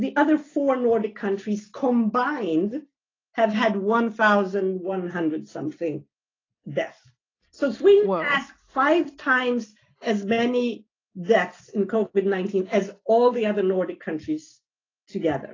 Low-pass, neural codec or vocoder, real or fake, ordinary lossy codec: 7.2 kHz; none; real; AAC, 32 kbps